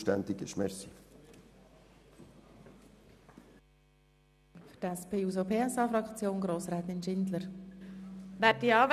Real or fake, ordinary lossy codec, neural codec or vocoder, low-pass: real; none; none; 14.4 kHz